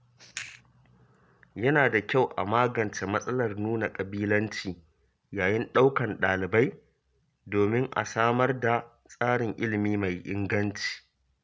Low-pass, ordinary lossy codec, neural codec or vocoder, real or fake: none; none; none; real